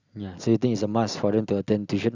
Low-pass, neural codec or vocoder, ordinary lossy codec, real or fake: 7.2 kHz; none; Opus, 64 kbps; real